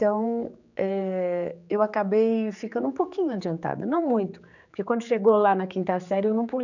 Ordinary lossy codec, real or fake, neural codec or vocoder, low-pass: none; fake; codec, 16 kHz, 4 kbps, X-Codec, HuBERT features, trained on general audio; 7.2 kHz